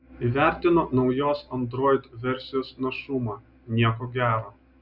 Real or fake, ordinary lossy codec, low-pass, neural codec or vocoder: real; Opus, 64 kbps; 5.4 kHz; none